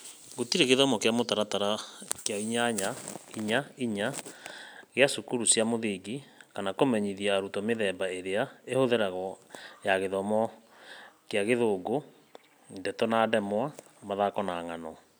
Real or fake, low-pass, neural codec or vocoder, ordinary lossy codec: real; none; none; none